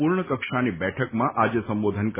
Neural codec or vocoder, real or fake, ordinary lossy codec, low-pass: none; real; MP3, 16 kbps; 3.6 kHz